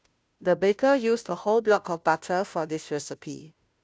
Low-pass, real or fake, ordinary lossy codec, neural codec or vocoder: none; fake; none; codec, 16 kHz, 0.5 kbps, FunCodec, trained on Chinese and English, 25 frames a second